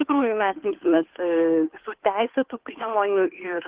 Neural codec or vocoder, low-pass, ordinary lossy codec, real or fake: codec, 16 kHz, 2 kbps, FunCodec, trained on Chinese and English, 25 frames a second; 3.6 kHz; Opus, 16 kbps; fake